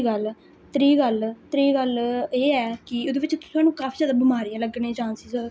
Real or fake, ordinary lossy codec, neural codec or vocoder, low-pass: real; none; none; none